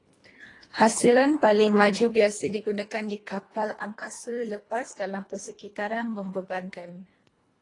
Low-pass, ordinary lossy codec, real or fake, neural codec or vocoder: 10.8 kHz; AAC, 32 kbps; fake; codec, 24 kHz, 1.5 kbps, HILCodec